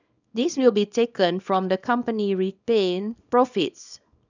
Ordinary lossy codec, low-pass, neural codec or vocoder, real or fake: none; 7.2 kHz; codec, 24 kHz, 0.9 kbps, WavTokenizer, small release; fake